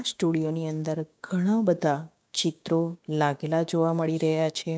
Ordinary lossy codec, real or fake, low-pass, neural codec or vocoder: none; fake; none; codec, 16 kHz, 6 kbps, DAC